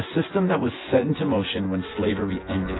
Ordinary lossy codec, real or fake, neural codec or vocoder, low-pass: AAC, 16 kbps; fake; vocoder, 24 kHz, 100 mel bands, Vocos; 7.2 kHz